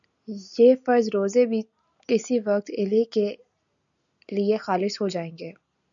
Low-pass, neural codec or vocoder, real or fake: 7.2 kHz; none; real